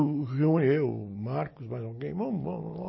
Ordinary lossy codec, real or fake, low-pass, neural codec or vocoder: MP3, 24 kbps; real; 7.2 kHz; none